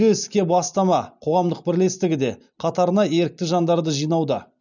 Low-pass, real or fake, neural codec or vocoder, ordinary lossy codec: 7.2 kHz; real; none; none